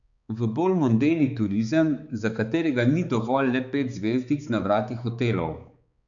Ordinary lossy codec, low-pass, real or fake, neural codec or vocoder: none; 7.2 kHz; fake; codec, 16 kHz, 4 kbps, X-Codec, HuBERT features, trained on balanced general audio